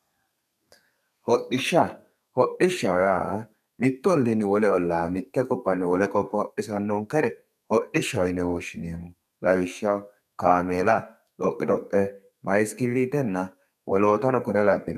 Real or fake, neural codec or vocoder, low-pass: fake; codec, 32 kHz, 1.9 kbps, SNAC; 14.4 kHz